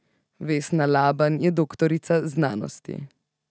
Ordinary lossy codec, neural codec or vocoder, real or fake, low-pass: none; none; real; none